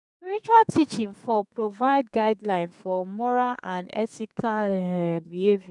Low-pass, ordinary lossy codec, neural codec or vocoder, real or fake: 10.8 kHz; none; codec, 32 kHz, 1.9 kbps, SNAC; fake